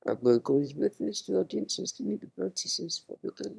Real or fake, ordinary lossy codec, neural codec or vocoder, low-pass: fake; none; autoencoder, 22.05 kHz, a latent of 192 numbers a frame, VITS, trained on one speaker; none